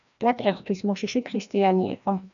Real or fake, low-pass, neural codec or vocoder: fake; 7.2 kHz; codec, 16 kHz, 1 kbps, FreqCodec, larger model